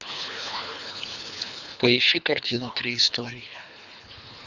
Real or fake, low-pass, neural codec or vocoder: fake; 7.2 kHz; codec, 24 kHz, 3 kbps, HILCodec